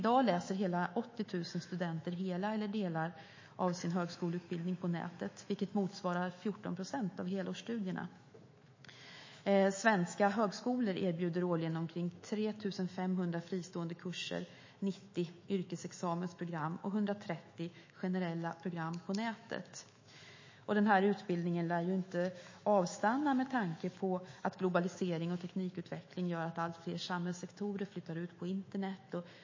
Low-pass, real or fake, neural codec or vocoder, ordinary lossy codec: 7.2 kHz; fake; autoencoder, 48 kHz, 128 numbers a frame, DAC-VAE, trained on Japanese speech; MP3, 32 kbps